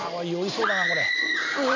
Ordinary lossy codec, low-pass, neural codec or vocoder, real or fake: MP3, 64 kbps; 7.2 kHz; none; real